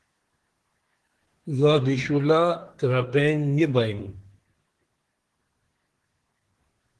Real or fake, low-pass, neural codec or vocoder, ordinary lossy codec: fake; 10.8 kHz; codec, 24 kHz, 1 kbps, SNAC; Opus, 16 kbps